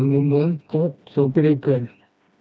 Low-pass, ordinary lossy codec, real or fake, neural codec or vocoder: none; none; fake; codec, 16 kHz, 1 kbps, FreqCodec, smaller model